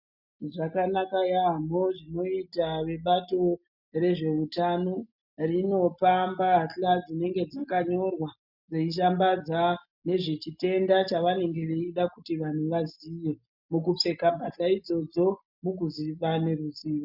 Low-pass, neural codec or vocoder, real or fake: 5.4 kHz; none; real